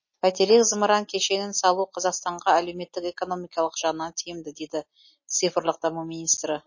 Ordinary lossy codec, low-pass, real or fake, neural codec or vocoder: MP3, 32 kbps; 7.2 kHz; real; none